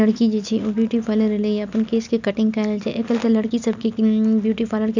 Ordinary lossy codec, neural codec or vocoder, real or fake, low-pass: none; none; real; 7.2 kHz